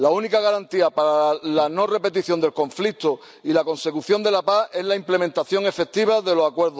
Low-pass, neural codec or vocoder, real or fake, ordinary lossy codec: none; none; real; none